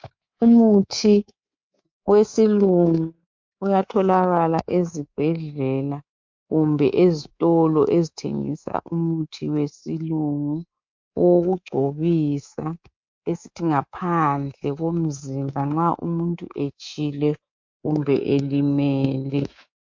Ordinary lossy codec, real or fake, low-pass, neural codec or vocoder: MP3, 48 kbps; fake; 7.2 kHz; codec, 24 kHz, 3.1 kbps, DualCodec